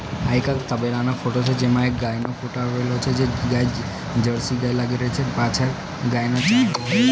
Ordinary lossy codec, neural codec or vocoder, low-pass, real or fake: none; none; none; real